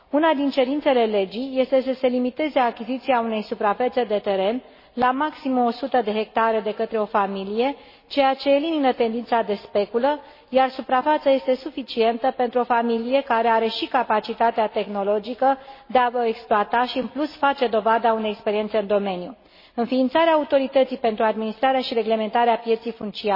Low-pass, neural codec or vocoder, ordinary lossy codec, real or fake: 5.4 kHz; none; MP3, 24 kbps; real